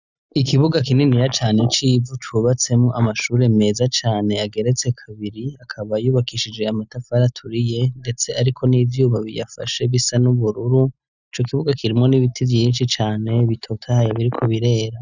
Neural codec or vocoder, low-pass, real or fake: none; 7.2 kHz; real